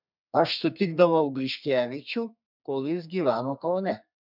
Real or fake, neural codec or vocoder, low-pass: fake; codec, 32 kHz, 1.9 kbps, SNAC; 5.4 kHz